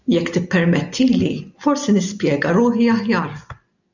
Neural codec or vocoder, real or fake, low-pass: none; real; 7.2 kHz